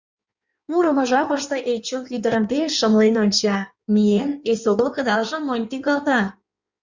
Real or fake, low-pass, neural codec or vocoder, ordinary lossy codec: fake; 7.2 kHz; codec, 16 kHz in and 24 kHz out, 1.1 kbps, FireRedTTS-2 codec; Opus, 64 kbps